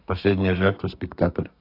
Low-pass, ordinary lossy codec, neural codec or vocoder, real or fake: 5.4 kHz; AAC, 32 kbps; codec, 32 kHz, 1.9 kbps, SNAC; fake